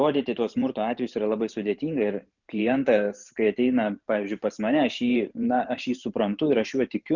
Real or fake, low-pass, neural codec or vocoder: fake; 7.2 kHz; vocoder, 44.1 kHz, 128 mel bands every 512 samples, BigVGAN v2